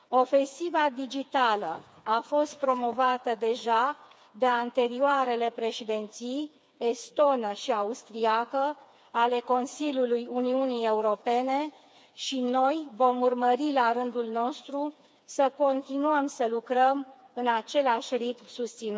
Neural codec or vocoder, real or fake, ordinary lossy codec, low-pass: codec, 16 kHz, 4 kbps, FreqCodec, smaller model; fake; none; none